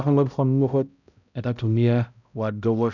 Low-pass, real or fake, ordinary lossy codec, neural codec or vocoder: 7.2 kHz; fake; none; codec, 16 kHz, 0.5 kbps, X-Codec, HuBERT features, trained on balanced general audio